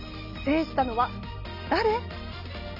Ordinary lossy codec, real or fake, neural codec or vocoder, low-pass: none; real; none; 5.4 kHz